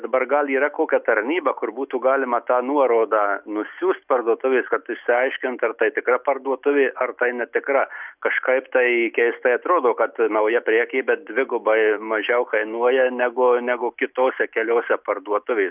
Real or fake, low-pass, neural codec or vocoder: real; 3.6 kHz; none